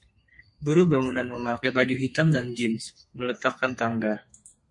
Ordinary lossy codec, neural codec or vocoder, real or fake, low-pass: MP3, 48 kbps; codec, 32 kHz, 1.9 kbps, SNAC; fake; 10.8 kHz